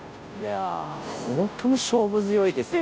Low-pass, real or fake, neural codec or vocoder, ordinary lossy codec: none; fake; codec, 16 kHz, 0.5 kbps, FunCodec, trained on Chinese and English, 25 frames a second; none